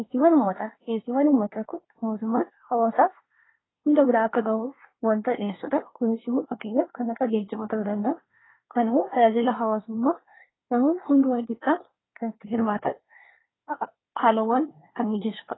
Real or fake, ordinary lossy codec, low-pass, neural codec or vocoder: fake; AAC, 16 kbps; 7.2 kHz; codec, 24 kHz, 1 kbps, SNAC